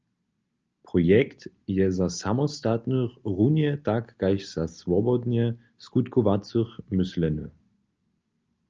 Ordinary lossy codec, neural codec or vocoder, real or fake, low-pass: Opus, 24 kbps; none; real; 7.2 kHz